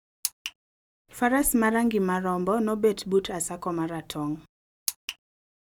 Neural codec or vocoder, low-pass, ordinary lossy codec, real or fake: none; 19.8 kHz; none; real